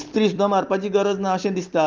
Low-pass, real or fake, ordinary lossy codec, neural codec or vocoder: 7.2 kHz; real; Opus, 32 kbps; none